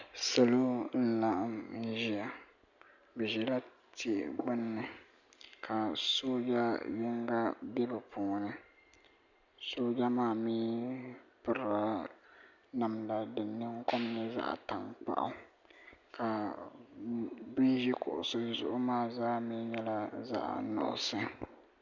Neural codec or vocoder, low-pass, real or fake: none; 7.2 kHz; real